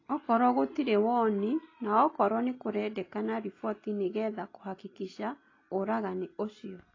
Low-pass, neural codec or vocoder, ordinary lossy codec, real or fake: 7.2 kHz; none; AAC, 32 kbps; real